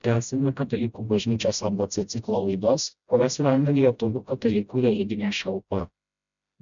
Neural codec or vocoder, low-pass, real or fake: codec, 16 kHz, 0.5 kbps, FreqCodec, smaller model; 7.2 kHz; fake